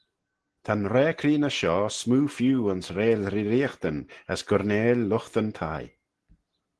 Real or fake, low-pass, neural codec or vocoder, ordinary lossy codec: real; 10.8 kHz; none; Opus, 16 kbps